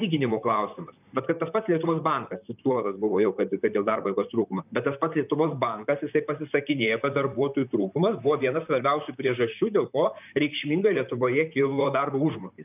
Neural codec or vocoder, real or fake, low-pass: vocoder, 44.1 kHz, 128 mel bands, Pupu-Vocoder; fake; 3.6 kHz